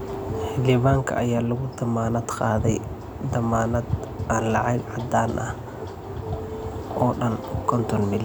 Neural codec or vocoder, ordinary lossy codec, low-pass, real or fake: none; none; none; real